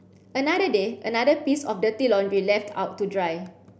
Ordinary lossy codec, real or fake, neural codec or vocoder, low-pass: none; real; none; none